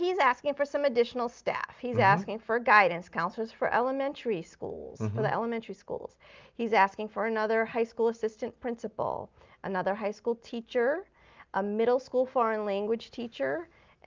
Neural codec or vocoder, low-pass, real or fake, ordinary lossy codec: none; 7.2 kHz; real; Opus, 24 kbps